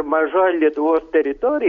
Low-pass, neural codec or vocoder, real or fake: 7.2 kHz; none; real